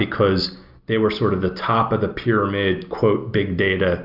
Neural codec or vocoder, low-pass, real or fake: none; 5.4 kHz; real